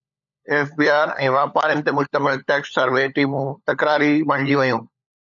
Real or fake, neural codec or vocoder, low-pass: fake; codec, 16 kHz, 16 kbps, FunCodec, trained on LibriTTS, 50 frames a second; 7.2 kHz